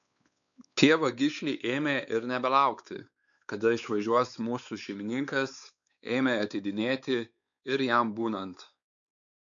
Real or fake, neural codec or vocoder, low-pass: fake; codec, 16 kHz, 4 kbps, X-Codec, WavLM features, trained on Multilingual LibriSpeech; 7.2 kHz